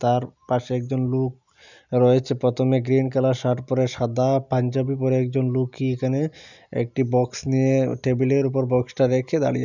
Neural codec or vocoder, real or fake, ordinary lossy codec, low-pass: none; real; none; 7.2 kHz